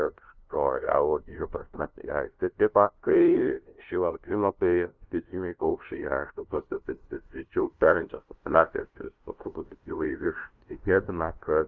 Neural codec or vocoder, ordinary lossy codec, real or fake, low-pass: codec, 16 kHz, 0.5 kbps, FunCodec, trained on LibriTTS, 25 frames a second; Opus, 32 kbps; fake; 7.2 kHz